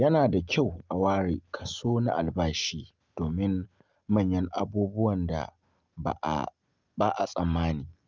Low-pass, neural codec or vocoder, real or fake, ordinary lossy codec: 7.2 kHz; none; real; Opus, 24 kbps